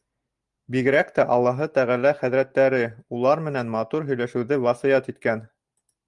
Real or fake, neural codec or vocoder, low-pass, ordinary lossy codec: real; none; 10.8 kHz; Opus, 32 kbps